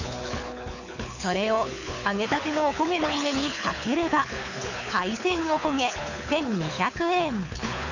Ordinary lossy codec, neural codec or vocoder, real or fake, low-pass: none; codec, 24 kHz, 6 kbps, HILCodec; fake; 7.2 kHz